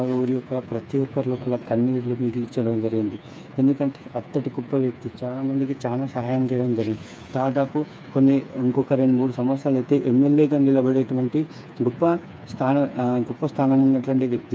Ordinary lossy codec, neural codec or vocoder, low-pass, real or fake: none; codec, 16 kHz, 4 kbps, FreqCodec, smaller model; none; fake